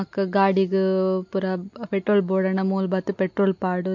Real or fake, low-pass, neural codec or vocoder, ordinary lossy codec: real; 7.2 kHz; none; MP3, 48 kbps